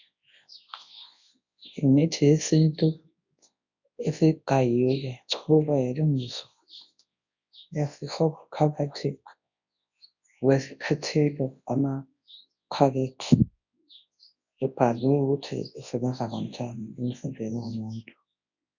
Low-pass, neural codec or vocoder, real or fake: 7.2 kHz; codec, 24 kHz, 0.9 kbps, WavTokenizer, large speech release; fake